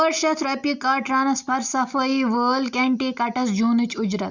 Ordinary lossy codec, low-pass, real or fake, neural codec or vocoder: Opus, 64 kbps; 7.2 kHz; real; none